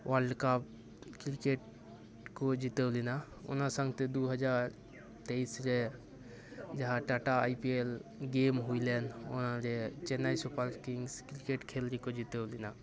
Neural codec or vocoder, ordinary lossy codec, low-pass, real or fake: none; none; none; real